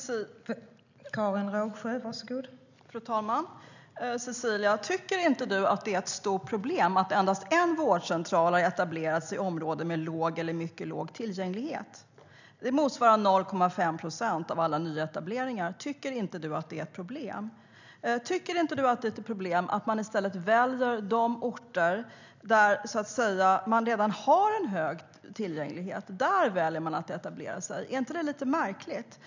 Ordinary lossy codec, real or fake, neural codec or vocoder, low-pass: none; real; none; 7.2 kHz